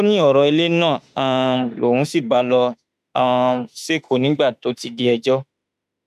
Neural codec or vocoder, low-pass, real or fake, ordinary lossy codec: autoencoder, 48 kHz, 32 numbers a frame, DAC-VAE, trained on Japanese speech; 14.4 kHz; fake; none